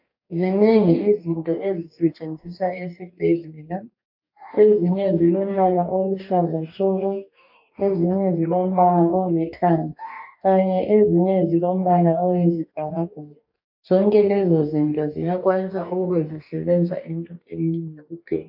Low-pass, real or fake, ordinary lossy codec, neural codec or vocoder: 5.4 kHz; fake; AAC, 48 kbps; codec, 44.1 kHz, 2.6 kbps, DAC